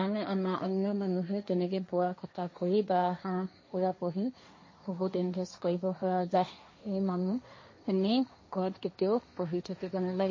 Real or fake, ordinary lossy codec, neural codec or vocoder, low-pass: fake; MP3, 32 kbps; codec, 16 kHz, 1.1 kbps, Voila-Tokenizer; 7.2 kHz